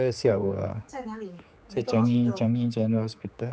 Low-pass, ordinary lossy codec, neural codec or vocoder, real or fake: none; none; codec, 16 kHz, 4 kbps, X-Codec, HuBERT features, trained on general audio; fake